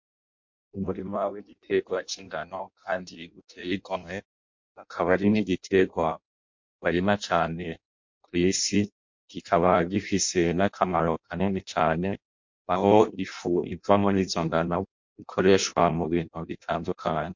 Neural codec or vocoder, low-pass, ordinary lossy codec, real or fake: codec, 16 kHz in and 24 kHz out, 0.6 kbps, FireRedTTS-2 codec; 7.2 kHz; MP3, 48 kbps; fake